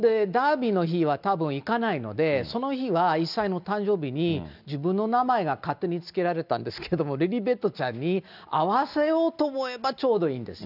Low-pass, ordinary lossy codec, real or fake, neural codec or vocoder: 5.4 kHz; none; real; none